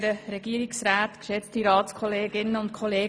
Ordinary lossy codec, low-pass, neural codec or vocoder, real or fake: none; none; none; real